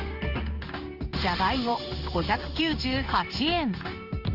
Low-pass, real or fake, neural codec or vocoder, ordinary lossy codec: 5.4 kHz; fake; codec, 16 kHz in and 24 kHz out, 1 kbps, XY-Tokenizer; Opus, 24 kbps